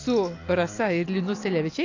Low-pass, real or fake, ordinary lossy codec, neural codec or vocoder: 7.2 kHz; real; AAC, 48 kbps; none